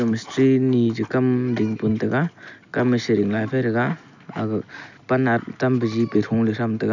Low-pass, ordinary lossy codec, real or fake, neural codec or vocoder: 7.2 kHz; none; real; none